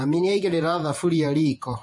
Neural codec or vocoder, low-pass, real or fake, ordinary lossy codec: vocoder, 48 kHz, 128 mel bands, Vocos; 10.8 kHz; fake; MP3, 48 kbps